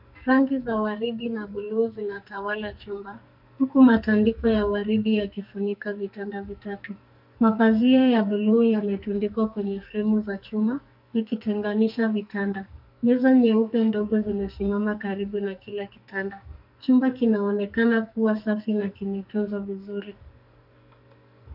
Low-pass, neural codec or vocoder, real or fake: 5.4 kHz; codec, 44.1 kHz, 2.6 kbps, SNAC; fake